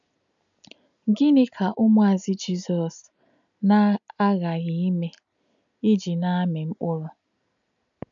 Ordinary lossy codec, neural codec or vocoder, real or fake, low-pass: none; none; real; 7.2 kHz